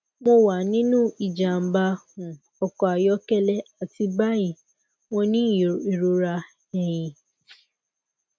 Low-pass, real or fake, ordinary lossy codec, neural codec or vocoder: none; real; none; none